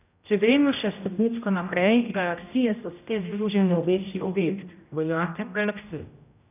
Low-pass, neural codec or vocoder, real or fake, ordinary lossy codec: 3.6 kHz; codec, 16 kHz, 0.5 kbps, X-Codec, HuBERT features, trained on general audio; fake; none